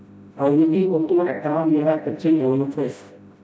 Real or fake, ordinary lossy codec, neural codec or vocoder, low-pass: fake; none; codec, 16 kHz, 0.5 kbps, FreqCodec, smaller model; none